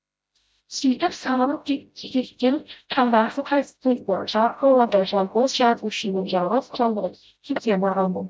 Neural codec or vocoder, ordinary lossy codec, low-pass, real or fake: codec, 16 kHz, 0.5 kbps, FreqCodec, smaller model; none; none; fake